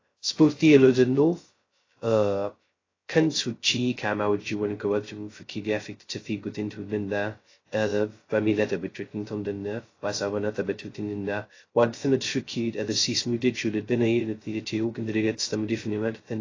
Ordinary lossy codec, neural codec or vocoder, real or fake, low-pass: AAC, 32 kbps; codec, 16 kHz, 0.2 kbps, FocalCodec; fake; 7.2 kHz